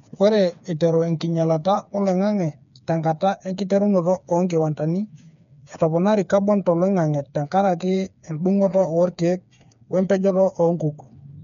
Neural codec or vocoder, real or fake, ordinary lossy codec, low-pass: codec, 16 kHz, 4 kbps, FreqCodec, smaller model; fake; none; 7.2 kHz